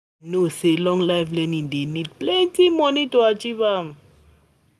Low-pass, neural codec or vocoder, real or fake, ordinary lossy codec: none; none; real; none